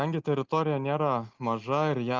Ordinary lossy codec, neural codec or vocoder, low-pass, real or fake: Opus, 32 kbps; none; 7.2 kHz; real